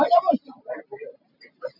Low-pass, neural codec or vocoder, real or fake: 5.4 kHz; none; real